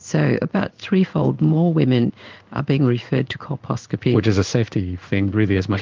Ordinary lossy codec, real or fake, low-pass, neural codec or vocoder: Opus, 32 kbps; fake; 7.2 kHz; codec, 16 kHz in and 24 kHz out, 1 kbps, XY-Tokenizer